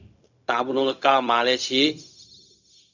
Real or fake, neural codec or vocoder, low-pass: fake; codec, 16 kHz, 0.4 kbps, LongCat-Audio-Codec; 7.2 kHz